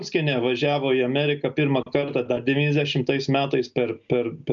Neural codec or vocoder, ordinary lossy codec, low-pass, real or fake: none; MP3, 64 kbps; 7.2 kHz; real